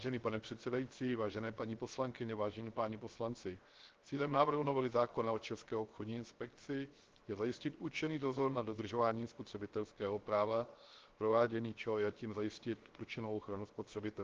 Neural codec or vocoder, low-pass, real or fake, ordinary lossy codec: codec, 16 kHz, 0.7 kbps, FocalCodec; 7.2 kHz; fake; Opus, 16 kbps